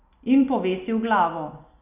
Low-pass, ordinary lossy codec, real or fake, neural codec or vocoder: 3.6 kHz; none; real; none